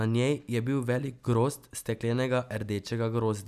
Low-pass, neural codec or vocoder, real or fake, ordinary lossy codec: 14.4 kHz; none; real; none